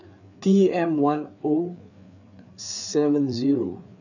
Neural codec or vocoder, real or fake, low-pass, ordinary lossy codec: codec, 16 kHz, 4 kbps, FreqCodec, larger model; fake; 7.2 kHz; none